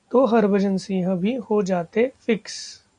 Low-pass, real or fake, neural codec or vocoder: 9.9 kHz; real; none